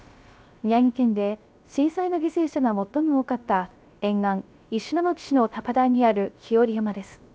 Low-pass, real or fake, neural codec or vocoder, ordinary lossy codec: none; fake; codec, 16 kHz, 0.3 kbps, FocalCodec; none